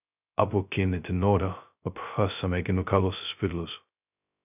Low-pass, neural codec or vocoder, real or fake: 3.6 kHz; codec, 16 kHz, 0.2 kbps, FocalCodec; fake